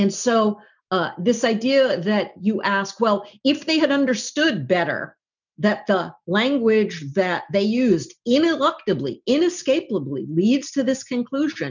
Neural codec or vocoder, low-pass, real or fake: none; 7.2 kHz; real